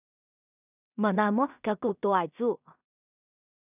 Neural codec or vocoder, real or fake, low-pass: codec, 16 kHz in and 24 kHz out, 0.4 kbps, LongCat-Audio-Codec, two codebook decoder; fake; 3.6 kHz